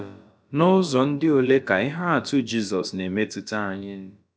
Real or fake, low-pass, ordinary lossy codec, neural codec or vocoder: fake; none; none; codec, 16 kHz, about 1 kbps, DyCAST, with the encoder's durations